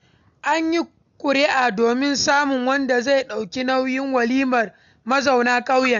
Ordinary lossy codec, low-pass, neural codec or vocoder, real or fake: none; 7.2 kHz; none; real